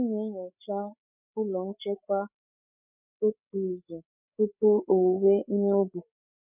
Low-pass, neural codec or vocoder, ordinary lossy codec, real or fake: 3.6 kHz; codec, 16 kHz, 16 kbps, FreqCodec, larger model; none; fake